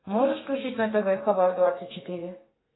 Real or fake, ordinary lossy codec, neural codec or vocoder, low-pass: fake; AAC, 16 kbps; codec, 32 kHz, 1.9 kbps, SNAC; 7.2 kHz